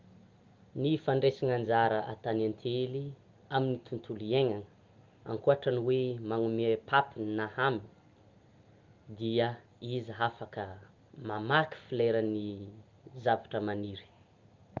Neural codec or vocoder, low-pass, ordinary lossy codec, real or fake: none; 7.2 kHz; Opus, 32 kbps; real